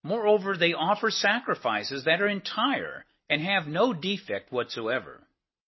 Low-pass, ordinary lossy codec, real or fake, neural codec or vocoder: 7.2 kHz; MP3, 24 kbps; real; none